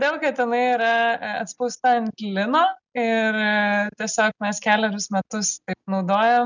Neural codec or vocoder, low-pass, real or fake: none; 7.2 kHz; real